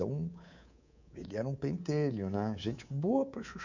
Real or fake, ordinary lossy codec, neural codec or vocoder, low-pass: fake; none; codec, 24 kHz, 3.1 kbps, DualCodec; 7.2 kHz